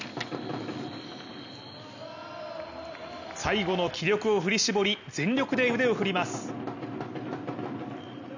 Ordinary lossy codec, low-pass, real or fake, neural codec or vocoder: none; 7.2 kHz; real; none